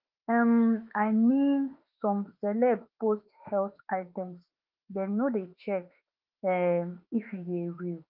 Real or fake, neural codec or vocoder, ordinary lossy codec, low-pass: fake; autoencoder, 48 kHz, 32 numbers a frame, DAC-VAE, trained on Japanese speech; Opus, 24 kbps; 5.4 kHz